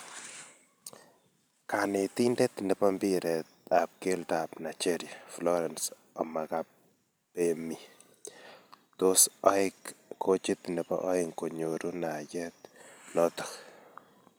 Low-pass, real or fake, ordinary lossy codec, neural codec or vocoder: none; fake; none; vocoder, 44.1 kHz, 128 mel bands every 512 samples, BigVGAN v2